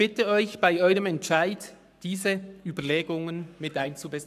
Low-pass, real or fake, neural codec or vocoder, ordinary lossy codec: 14.4 kHz; fake; codec, 44.1 kHz, 7.8 kbps, Pupu-Codec; none